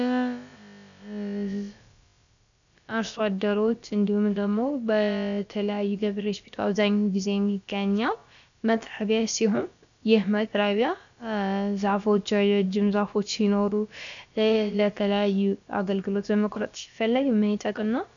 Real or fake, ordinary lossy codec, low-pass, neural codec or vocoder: fake; MP3, 96 kbps; 7.2 kHz; codec, 16 kHz, about 1 kbps, DyCAST, with the encoder's durations